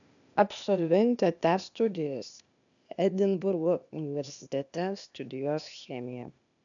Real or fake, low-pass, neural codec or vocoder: fake; 7.2 kHz; codec, 16 kHz, 0.8 kbps, ZipCodec